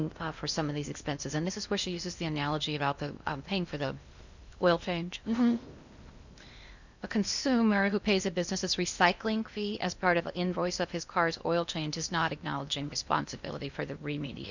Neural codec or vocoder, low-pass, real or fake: codec, 16 kHz in and 24 kHz out, 0.8 kbps, FocalCodec, streaming, 65536 codes; 7.2 kHz; fake